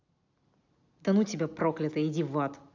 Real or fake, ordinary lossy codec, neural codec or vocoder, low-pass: real; AAC, 48 kbps; none; 7.2 kHz